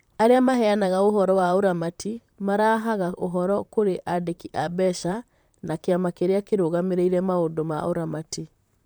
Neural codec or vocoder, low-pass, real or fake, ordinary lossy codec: vocoder, 44.1 kHz, 128 mel bands, Pupu-Vocoder; none; fake; none